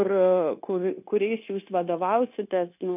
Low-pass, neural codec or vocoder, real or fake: 3.6 kHz; codec, 16 kHz, 0.9 kbps, LongCat-Audio-Codec; fake